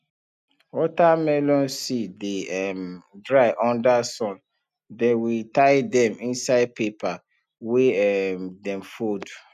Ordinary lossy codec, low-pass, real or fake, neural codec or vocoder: none; 14.4 kHz; real; none